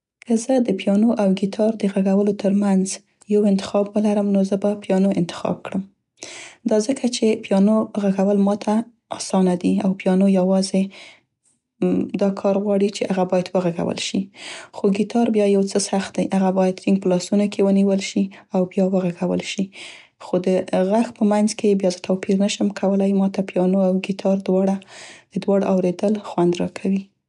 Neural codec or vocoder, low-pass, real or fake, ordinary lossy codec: none; 10.8 kHz; real; none